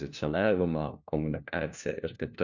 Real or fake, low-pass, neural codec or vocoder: fake; 7.2 kHz; codec, 16 kHz, 1 kbps, FunCodec, trained on LibriTTS, 50 frames a second